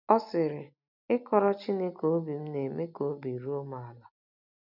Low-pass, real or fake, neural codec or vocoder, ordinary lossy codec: 5.4 kHz; real; none; none